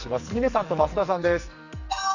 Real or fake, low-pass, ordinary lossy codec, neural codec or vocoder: fake; 7.2 kHz; none; codec, 44.1 kHz, 2.6 kbps, SNAC